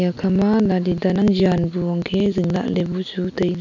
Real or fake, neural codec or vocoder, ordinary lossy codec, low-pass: real; none; none; 7.2 kHz